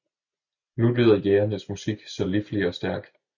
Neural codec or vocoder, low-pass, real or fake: none; 7.2 kHz; real